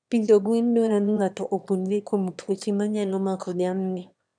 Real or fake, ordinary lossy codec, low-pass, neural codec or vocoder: fake; none; 9.9 kHz; autoencoder, 22.05 kHz, a latent of 192 numbers a frame, VITS, trained on one speaker